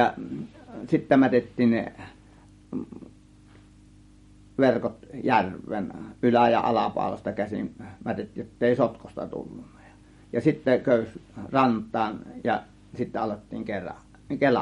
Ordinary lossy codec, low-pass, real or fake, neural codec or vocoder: MP3, 48 kbps; 19.8 kHz; real; none